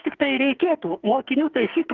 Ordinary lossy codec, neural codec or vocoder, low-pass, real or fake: Opus, 16 kbps; codec, 44.1 kHz, 2.6 kbps, SNAC; 7.2 kHz; fake